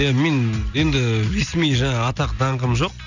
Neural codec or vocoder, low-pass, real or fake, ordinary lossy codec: none; 7.2 kHz; real; none